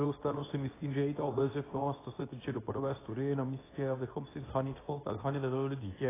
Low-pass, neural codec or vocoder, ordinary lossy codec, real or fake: 3.6 kHz; codec, 24 kHz, 0.9 kbps, WavTokenizer, medium speech release version 2; AAC, 16 kbps; fake